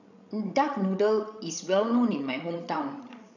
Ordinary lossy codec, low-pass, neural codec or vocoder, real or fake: none; 7.2 kHz; codec, 16 kHz, 16 kbps, FreqCodec, larger model; fake